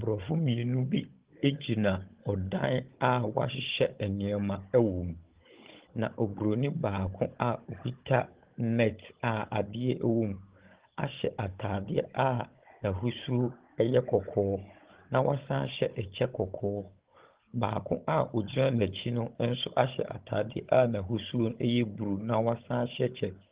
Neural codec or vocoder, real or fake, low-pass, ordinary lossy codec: codec, 16 kHz, 16 kbps, FunCodec, trained on Chinese and English, 50 frames a second; fake; 3.6 kHz; Opus, 16 kbps